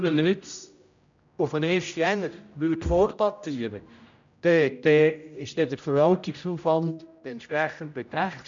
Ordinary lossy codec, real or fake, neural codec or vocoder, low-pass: MP3, 64 kbps; fake; codec, 16 kHz, 0.5 kbps, X-Codec, HuBERT features, trained on general audio; 7.2 kHz